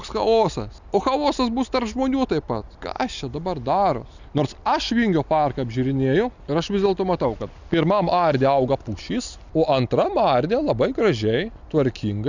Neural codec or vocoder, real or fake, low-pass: none; real; 7.2 kHz